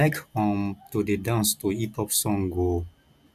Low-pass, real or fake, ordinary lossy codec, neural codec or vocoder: 14.4 kHz; real; none; none